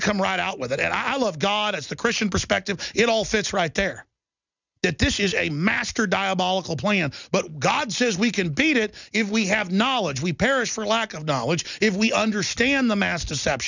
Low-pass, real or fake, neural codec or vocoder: 7.2 kHz; real; none